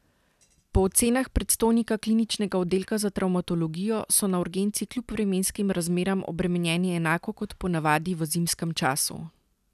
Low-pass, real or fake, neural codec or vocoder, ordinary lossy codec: 14.4 kHz; real; none; none